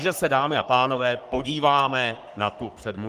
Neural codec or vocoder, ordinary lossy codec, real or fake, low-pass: codec, 44.1 kHz, 3.4 kbps, Pupu-Codec; Opus, 24 kbps; fake; 14.4 kHz